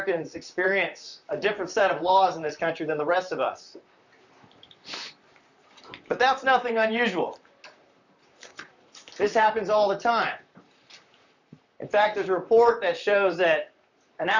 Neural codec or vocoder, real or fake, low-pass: vocoder, 44.1 kHz, 128 mel bands, Pupu-Vocoder; fake; 7.2 kHz